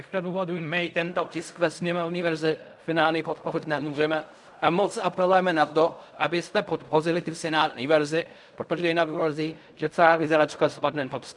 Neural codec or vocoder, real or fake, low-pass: codec, 16 kHz in and 24 kHz out, 0.4 kbps, LongCat-Audio-Codec, fine tuned four codebook decoder; fake; 10.8 kHz